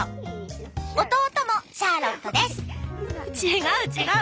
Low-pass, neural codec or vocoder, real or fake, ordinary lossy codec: none; none; real; none